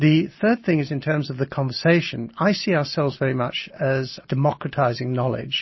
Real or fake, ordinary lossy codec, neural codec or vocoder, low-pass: real; MP3, 24 kbps; none; 7.2 kHz